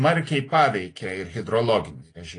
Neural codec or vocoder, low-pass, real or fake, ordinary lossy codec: none; 9.9 kHz; real; AAC, 32 kbps